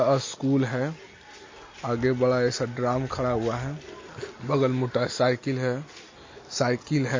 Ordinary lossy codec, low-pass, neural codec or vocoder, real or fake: MP3, 32 kbps; 7.2 kHz; none; real